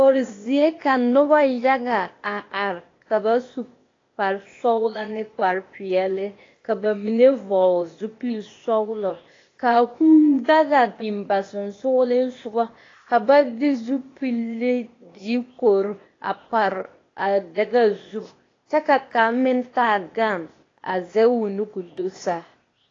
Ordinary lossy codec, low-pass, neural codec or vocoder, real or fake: AAC, 32 kbps; 7.2 kHz; codec, 16 kHz, 0.8 kbps, ZipCodec; fake